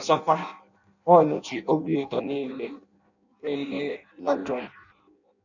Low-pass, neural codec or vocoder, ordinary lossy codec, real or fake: 7.2 kHz; codec, 16 kHz in and 24 kHz out, 0.6 kbps, FireRedTTS-2 codec; none; fake